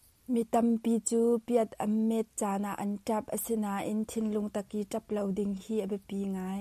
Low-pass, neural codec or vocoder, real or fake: 14.4 kHz; none; real